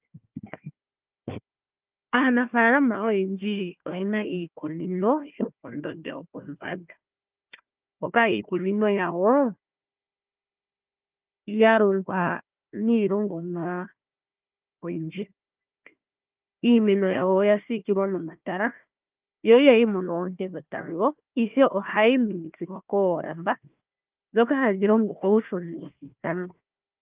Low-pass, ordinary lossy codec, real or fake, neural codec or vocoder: 3.6 kHz; Opus, 24 kbps; fake; codec, 16 kHz, 1 kbps, FunCodec, trained on Chinese and English, 50 frames a second